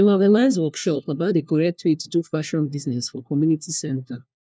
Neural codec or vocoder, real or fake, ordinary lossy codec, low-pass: codec, 16 kHz, 1 kbps, FunCodec, trained on LibriTTS, 50 frames a second; fake; none; none